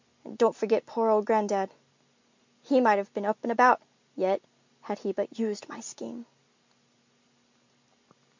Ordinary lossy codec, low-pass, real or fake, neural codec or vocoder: MP3, 64 kbps; 7.2 kHz; real; none